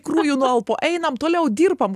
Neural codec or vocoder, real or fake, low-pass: none; real; 14.4 kHz